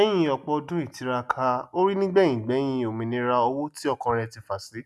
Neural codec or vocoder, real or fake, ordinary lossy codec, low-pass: none; real; none; none